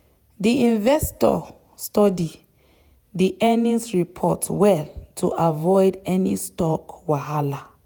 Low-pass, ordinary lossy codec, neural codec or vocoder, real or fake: none; none; vocoder, 48 kHz, 128 mel bands, Vocos; fake